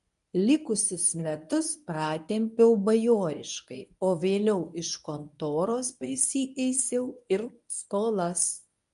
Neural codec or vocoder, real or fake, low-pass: codec, 24 kHz, 0.9 kbps, WavTokenizer, medium speech release version 1; fake; 10.8 kHz